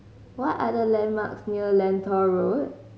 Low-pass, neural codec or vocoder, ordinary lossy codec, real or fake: none; none; none; real